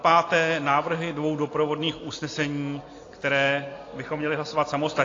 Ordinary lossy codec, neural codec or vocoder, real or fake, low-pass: AAC, 32 kbps; none; real; 7.2 kHz